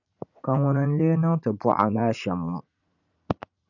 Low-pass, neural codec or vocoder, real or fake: 7.2 kHz; vocoder, 44.1 kHz, 80 mel bands, Vocos; fake